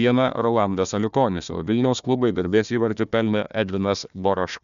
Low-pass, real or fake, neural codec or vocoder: 7.2 kHz; fake; codec, 16 kHz, 1 kbps, FunCodec, trained on Chinese and English, 50 frames a second